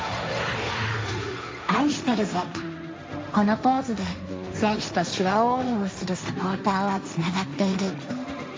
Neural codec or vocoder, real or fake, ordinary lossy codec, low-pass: codec, 16 kHz, 1.1 kbps, Voila-Tokenizer; fake; none; none